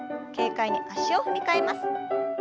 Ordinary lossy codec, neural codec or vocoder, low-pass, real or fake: none; none; none; real